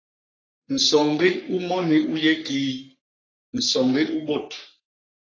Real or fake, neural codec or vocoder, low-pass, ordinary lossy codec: fake; codec, 44.1 kHz, 3.4 kbps, Pupu-Codec; 7.2 kHz; AAC, 32 kbps